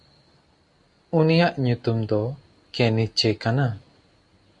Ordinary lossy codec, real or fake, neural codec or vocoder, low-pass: MP3, 64 kbps; real; none; 10.8 kHz